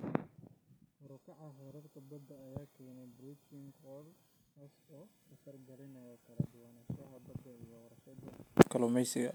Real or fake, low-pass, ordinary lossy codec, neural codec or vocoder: real; none; none; none